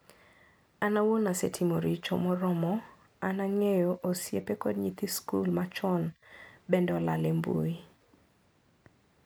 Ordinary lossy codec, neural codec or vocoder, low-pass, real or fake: none; none; none; real